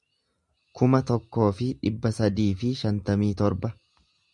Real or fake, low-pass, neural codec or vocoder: real; 10.8 kHz; none